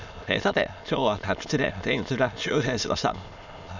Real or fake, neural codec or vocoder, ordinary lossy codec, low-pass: fake; autoencoder, 22.05 kHz, a latent of 192 numbers a frame, VITS, trained on many speakers; none; 7.2 kHz